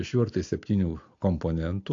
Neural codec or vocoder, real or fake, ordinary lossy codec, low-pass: none; real; AAC, 48 kbps; 7.2 kHz